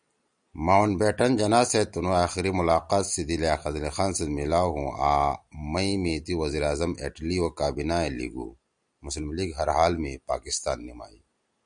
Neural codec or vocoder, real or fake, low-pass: none; real; 9.9 kHz